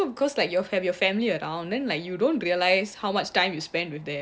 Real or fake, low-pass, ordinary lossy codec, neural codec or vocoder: real; none; none; none